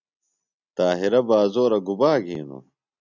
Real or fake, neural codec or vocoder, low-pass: real; none; 7.2 kHz